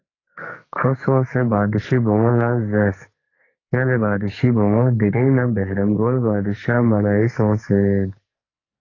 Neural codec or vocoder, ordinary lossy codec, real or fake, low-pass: codec, 44.1 kHz, 2.6 kbps, SNAC; AAC, 32 kbps; fake; 7.2 kHz